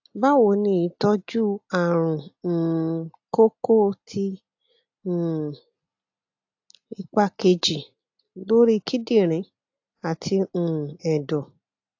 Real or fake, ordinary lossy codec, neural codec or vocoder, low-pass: real; AAC, 48 kbps; none; 7.2 kHz